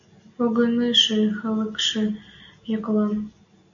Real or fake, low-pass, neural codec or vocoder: real; 7.2 kHz; none